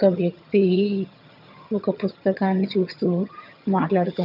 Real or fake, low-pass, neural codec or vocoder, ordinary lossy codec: fake; 5.4 kHz; vocoder, 22.05 kHz, 80 mel bands, HiFi-GAN; none